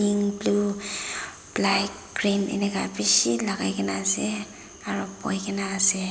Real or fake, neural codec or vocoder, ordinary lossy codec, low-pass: real; none; none; none